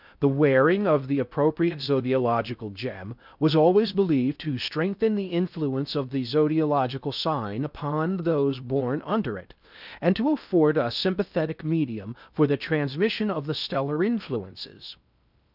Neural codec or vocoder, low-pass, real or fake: codec, 16 kHz in and 24 kHz out, 0.6 kbps, FocalCodec, streaming, 2048 codes; 5.4 kHz; fake